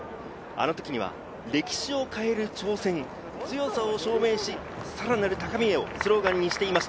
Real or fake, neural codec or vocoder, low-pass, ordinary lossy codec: real; none; none; none